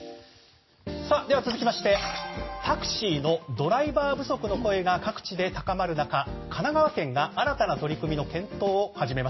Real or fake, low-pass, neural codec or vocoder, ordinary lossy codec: real; 7.2 kHz; none; MP3, 24 kbps